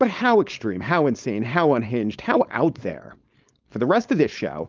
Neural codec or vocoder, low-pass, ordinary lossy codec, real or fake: codec, 16 kHz, 4.8 kbps, FACodec; 7.2 kHz; Opus, 24 kbps; fake